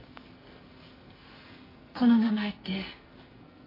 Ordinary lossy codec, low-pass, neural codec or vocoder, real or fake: AAC, 24 kbps; 5.4 kHz; codec, 44.1 kHz, 2.6 kbps, SNAC; fake